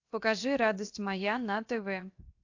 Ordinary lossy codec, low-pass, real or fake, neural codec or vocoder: AAC, 48 kbps; 7.2 kHz; fake; codec, 16 kHz, 0.7 kbps, FocalCodec